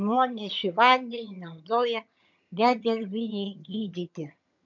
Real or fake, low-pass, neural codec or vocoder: fake; 7.2 kHz; vocoder, 22.05 kHz, 80 mel bands, HiFi-GAN